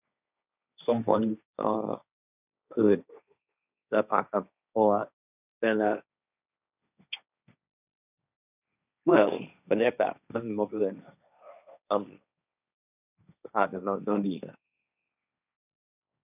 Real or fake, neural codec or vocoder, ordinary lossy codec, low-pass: fake; codec, 16 kHz, 1.1 kbps, Voila-Tokenizer; none; 3.6 kHz